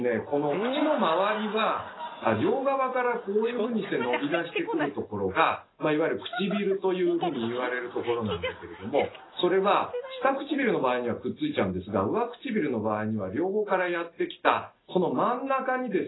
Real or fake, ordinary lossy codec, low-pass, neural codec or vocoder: real; AAC, 16 kbps; 7.2 kHz; none